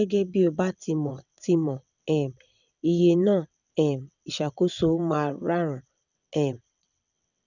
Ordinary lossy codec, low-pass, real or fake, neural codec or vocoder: none; 7.2 kHz; fake; vocoder, 22.05 kHz, 80 mel bands, WaveNeXt